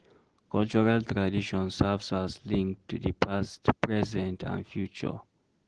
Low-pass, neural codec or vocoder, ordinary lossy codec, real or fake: 9.9 kHz; none; Opus, 16 kbps; real